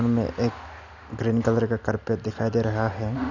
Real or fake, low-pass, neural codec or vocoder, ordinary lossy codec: real; 7.2 kHz; none; none